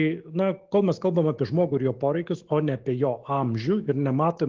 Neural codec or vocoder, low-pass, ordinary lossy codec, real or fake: autoencoder, 48 kHz, 128 numbers a frame, DAC-VAE, trained on Japanese speech; 7.2 kHz; Opus, 16 kbps; fake